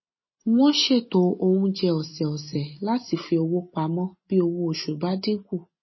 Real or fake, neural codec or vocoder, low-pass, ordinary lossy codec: real; none; 7.2 kHz; MP3, 24 kbps